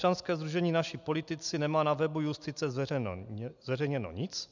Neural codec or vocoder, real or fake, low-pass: none; real; 7.2 kHz